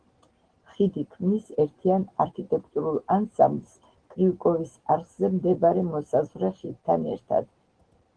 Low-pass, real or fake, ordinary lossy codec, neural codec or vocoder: 9.9 kHz; real; Opus, 16 kbps; none